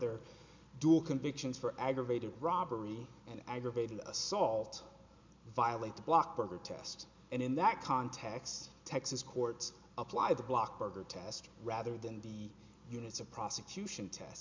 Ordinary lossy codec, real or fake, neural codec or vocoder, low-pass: MP3, 64 kbps; real; none; 7.2 kHz